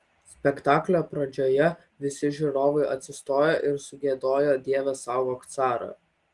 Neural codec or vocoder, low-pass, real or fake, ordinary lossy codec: none; 10.8 kHz; real; Opus, 24 kbps